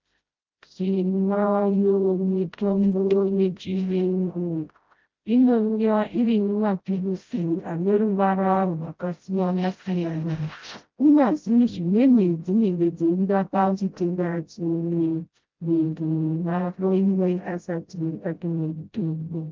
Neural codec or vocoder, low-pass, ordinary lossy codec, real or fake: codec, 16 kHz, 0.5 kbps, FreqCodec, smaller model; 7.2 kHz; Opus, 32 kbps; fake